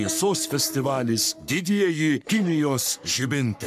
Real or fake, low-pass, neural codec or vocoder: fake; 14.4 kHz; codec, 44.1 kHz, 3.4 kbps, Pupu-Codec